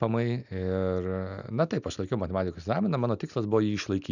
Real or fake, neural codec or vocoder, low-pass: real; none; 7.2 kHz